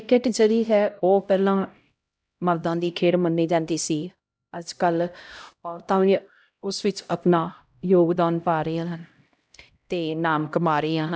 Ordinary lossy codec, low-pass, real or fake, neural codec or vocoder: none; none; fake; codec, 16 kHz, 0.5 kbps, X-Codec, HuBERT features, trained on LibriSpeech